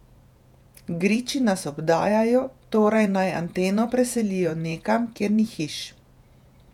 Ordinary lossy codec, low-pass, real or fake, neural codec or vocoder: none; 19.8 kHz; fake; vocoder, 48 kHz, 128 mel bands, Vocos